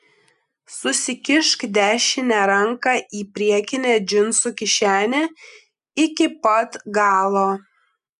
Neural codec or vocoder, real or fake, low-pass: none; real; 10.8 kHz